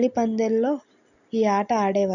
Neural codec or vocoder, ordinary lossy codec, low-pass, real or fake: none; none; 7.2 kHz; real